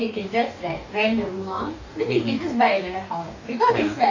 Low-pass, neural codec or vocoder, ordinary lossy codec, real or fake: 7.2 kHz; codec, 44.1 kHz, 2.6 kbps, DAC; none; fake